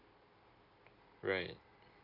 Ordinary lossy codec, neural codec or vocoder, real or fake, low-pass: none; none; real; 5.4 kHz